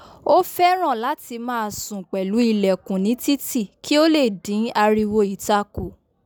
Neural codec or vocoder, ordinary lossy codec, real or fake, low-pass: none; none; real; none